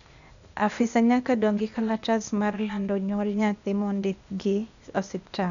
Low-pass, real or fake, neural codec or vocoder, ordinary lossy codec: 7.2 kHz; fake; codec, 16 kHz, 0.8 kbps, ZipCodec; none